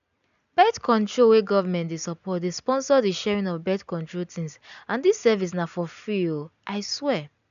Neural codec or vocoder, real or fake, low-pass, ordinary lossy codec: none; real; 7.2 kHz; none